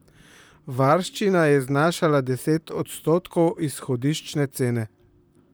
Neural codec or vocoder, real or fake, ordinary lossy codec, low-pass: vocoder, 44.1 kHz, 128 mel bands, Pupu-Vocoder; fake; none; none